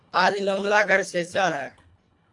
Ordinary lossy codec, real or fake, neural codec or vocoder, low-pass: AAC, 64 kbps; fake; codec, 24 kHz, 1.5 kbps, HILCodec; 10.8 kHz